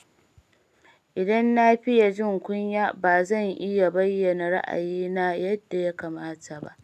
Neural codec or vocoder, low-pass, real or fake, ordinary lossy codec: none; 14.4 kHz; real; none